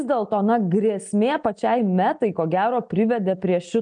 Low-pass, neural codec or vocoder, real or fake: 9.9 kHz; none; real